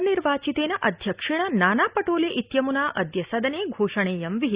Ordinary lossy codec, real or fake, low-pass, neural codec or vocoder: Opus, 64 kbps; real; 3.6 kHz; none